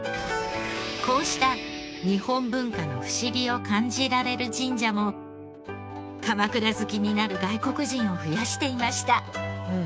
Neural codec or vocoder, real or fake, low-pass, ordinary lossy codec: codec, 16 kHz, 6 kbps, DAC; fake; none; none